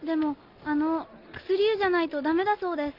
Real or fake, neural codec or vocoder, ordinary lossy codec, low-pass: real; none; Opus, 24 kbps; 5.4 kHz